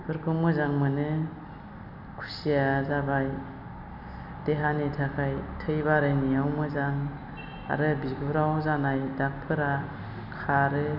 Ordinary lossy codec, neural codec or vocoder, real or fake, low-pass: none; none; real; 5.4 kHz